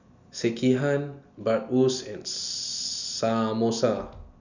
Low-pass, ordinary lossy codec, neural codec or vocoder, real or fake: 7.2 kHz; none; none; real